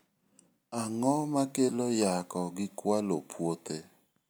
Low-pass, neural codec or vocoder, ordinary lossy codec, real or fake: none; none; none; real